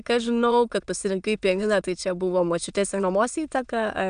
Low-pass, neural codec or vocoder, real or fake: 9.9 kHz; autoencoder, 22.05 kHz, a latent of 192 numbers a frame, VITS, trained on many speakers; fake